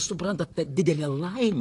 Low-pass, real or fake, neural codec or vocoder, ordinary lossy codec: 10.8 kHz; fake; vocoder, 44.1 kHz, 128 mel bands, Pupu-Vocoder; AAC, 48 kbps